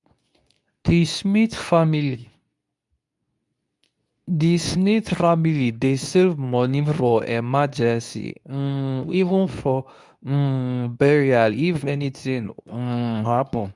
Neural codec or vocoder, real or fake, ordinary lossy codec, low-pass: codec, 24 kHz, 0.9 kbps, WavTokenizer, medium speech release version 2; fake; none; 10.8 kHz